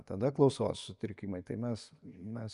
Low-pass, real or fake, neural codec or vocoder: 10.8 kHz; fake; vocoder, 24 kHz, 100 mel bands, Vocos